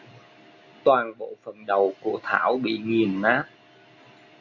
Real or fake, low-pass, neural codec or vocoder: real; 7.2 kHz; none